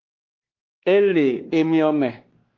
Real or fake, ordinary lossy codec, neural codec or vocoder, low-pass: fake; Opus, 16 kbps; codec, 16 kHz, 2 kbps, X-Codec, WavLM features, trained on Multilingual LibriSpeech; 7.2 kHz